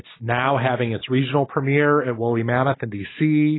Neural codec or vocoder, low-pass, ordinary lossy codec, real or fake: none; 7.2 kHz; AAC, 16 kbps; real